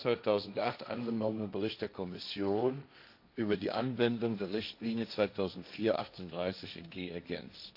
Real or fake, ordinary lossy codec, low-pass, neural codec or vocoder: fake; AAC, 48 kbps; 5.4 kHz; codec, 16 kHz, 1.1 kbps, Voila-Tokenizer